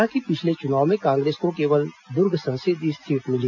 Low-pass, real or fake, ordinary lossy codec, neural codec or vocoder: 7.2 kHz; real; none; none